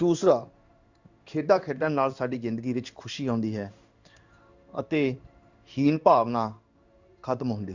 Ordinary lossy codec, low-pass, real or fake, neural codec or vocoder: Opus, 64 kbps; 7.2 kHz; fake; codec, 16 kHz in and 24 kHz out, 1 kbps, XY-Tokenizer